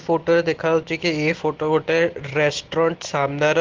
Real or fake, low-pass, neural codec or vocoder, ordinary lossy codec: real; 7.2 kHz; none; Opus, 16 kbps